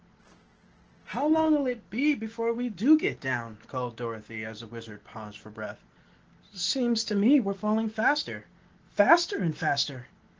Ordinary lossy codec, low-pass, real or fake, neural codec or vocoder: Opus, 16 kbps; 7.2 kHz; real; none